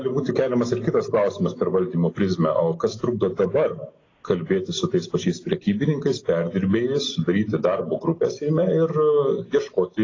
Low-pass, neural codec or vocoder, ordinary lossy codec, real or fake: 7.2 kHz; none; AAC, 32 kbps; real